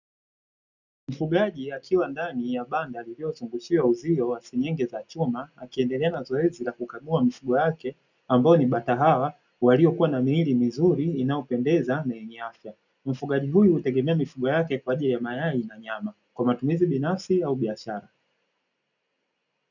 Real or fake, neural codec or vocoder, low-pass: real; none; 7.2 kHz